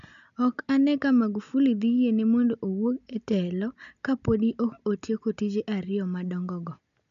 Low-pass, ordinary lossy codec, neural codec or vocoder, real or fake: 7.2 kHz; none; none; real